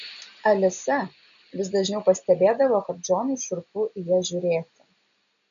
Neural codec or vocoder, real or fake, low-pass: none; real; 7.2 kHz